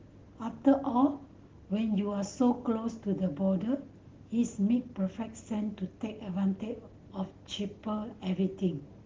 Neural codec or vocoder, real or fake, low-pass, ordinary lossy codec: none; real; 7.2 kHz; Opus, 16 kbps